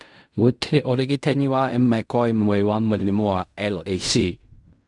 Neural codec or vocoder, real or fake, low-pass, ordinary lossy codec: codec, 16 kHz in and 24 kHz out, 0.4 kbps, LongCat-Audio-Codec, fine tuned four codebook decoder; fake; 10.8 kHz; none